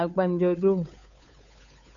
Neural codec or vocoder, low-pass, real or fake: codec, 16 kHz, 8 kbps, FunCodec, trained on Chinese and English, 25 frames a second; 7.2 kHz; fake